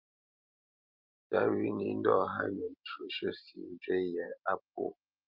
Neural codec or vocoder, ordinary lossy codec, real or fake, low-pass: none; Opus, 32 kbps; real; 5.4 kHz